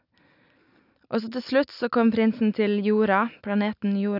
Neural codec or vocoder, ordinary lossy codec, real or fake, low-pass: none; none; real; 5.4 kHz